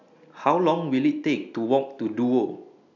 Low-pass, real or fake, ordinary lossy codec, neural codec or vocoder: 7.2 kHz; real; none; none